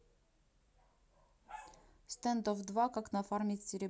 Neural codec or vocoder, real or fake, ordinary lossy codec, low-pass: none; real; none; none